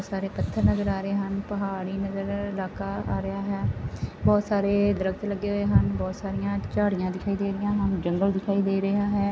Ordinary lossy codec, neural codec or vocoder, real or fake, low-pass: none; none; real; none